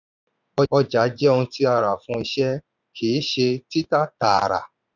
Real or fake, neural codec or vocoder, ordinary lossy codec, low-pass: fake; vocoder, 44.1 kHz, 80 mel bands, Vocos; none; 7.2 kHz